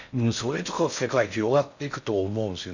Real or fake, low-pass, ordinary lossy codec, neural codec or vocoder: fake; 7.2 kHz; none; codec, 16 kHz in and 24 kHz out, 0.6 kbps, FocalCodec, streaming, 4096 codes